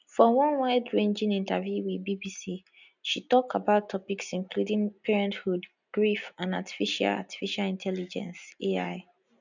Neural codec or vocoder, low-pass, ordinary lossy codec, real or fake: none; 7.2 kHz; none; real